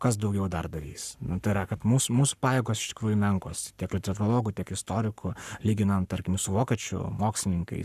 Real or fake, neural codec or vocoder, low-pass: fake; codec, 44.1 kHz, 7.8 kbps, Pupu-Codec; 14.4 kHz